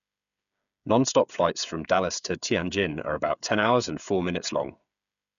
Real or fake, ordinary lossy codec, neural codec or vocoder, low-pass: fake; none; codec, 16 kHz, 8 kbps, FreqCodec, smaller model; 7.2 kHz